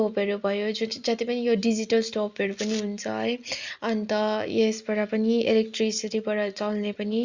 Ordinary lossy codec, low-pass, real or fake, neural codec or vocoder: Opus, 64 kbps; 7.2 kHz; real; none